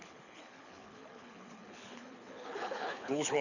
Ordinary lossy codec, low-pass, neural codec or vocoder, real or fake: none; 7.2 kHz; codec, 24 kHz, 6 kbps, HILCodec; fake